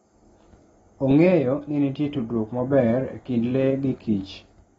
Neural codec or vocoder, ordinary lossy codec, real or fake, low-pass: none; AAC, 24 kbps; real; 10.8 kHz